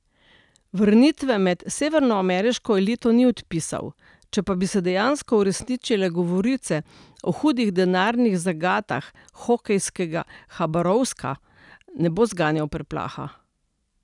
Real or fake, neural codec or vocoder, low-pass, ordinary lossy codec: real; none; 10.8 kHz; none